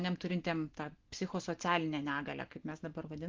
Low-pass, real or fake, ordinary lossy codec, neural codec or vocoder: 7.2 kHz; real; Opus, 16 kbps; none